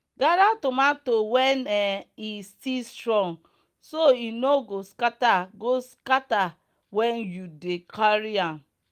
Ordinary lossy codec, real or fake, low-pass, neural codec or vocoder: Opus, 32 kbps; real; 14.4 kHz; none